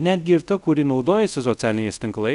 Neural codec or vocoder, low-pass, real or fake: codec, 24 kHz, 0.5 kbps, DualCodec; 10.8 kHz; fake